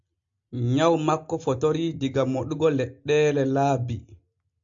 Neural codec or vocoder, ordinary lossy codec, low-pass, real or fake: none; MP3, 64 kbps; 7.2 kHz; real